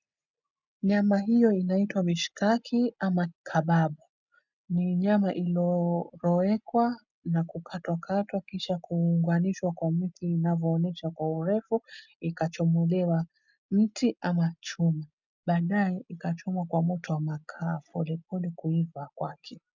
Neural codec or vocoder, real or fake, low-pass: none; real; 7.2 kHz